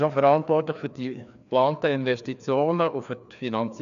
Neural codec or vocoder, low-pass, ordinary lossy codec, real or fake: codec, 16 kHz, 2 kbps, FreqCodec, larger model; 7.2 kHz; none; fake